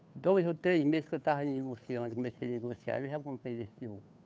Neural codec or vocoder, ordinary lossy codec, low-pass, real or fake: codec, 16 kHz, 2 kbps, FunCodec, trained on Chinese and English, 25 frames a second; none; none; fake